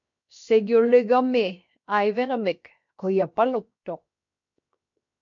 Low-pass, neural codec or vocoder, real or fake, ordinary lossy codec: 7.2 kHz; codec, 16 kHz, 0.7 kbps, FocalCodec; fake; MP3, 48 kbps